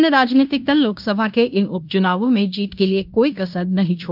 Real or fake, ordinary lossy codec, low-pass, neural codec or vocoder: fake; none; 5.4 kHz; codec, 16 kHz in and 24 kHz out, 0.9 kbps, LongCat-Audio-Codec, fine tuned four codebook decoder